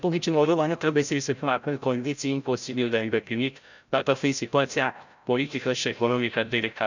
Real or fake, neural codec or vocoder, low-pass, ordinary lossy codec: fake; codec, 16 kHz, 0.5 kbps, FreqCodec, larger model; 7.2 kHz; none